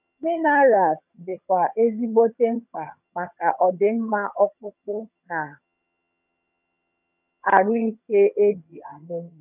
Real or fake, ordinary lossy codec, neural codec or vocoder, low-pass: fake; none; vocoder, 22.05 kHz, 80 mel bands, HiFi-GAN; 3.6 kHz